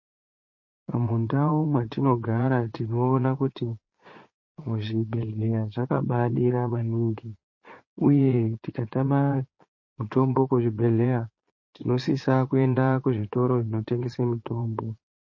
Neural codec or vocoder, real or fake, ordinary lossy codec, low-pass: vocoder, 22.05 kHz, 80 mel bands, Vocos; fake; MP3, 32 kbps; 7.2 kHz